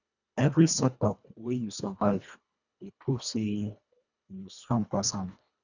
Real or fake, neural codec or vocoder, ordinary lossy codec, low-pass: fake; codec, 24 kHz, 1.5 kbps, HILCodec; none; 7.2 kHz